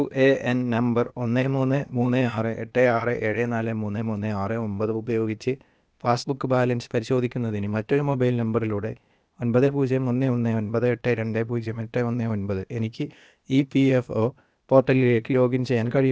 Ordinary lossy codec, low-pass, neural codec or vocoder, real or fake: none; none; codec, 16 kHz, 0.8 kbps, ZipCodec; fake